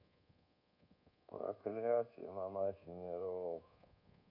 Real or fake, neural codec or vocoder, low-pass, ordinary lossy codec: fake; codec, 24 kHz, 1.2 kbps, DualCodec; 5.4 kHz; none